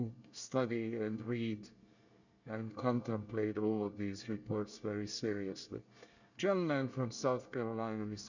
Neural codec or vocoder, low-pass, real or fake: codec, 24 kHz, 1 kbps, SNAC; 7.2 kHz; fake